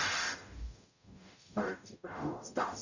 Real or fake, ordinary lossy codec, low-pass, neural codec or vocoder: fake; none; 7.2 kHz; codec, 44.1 kHz, 0.9 kbps, DAC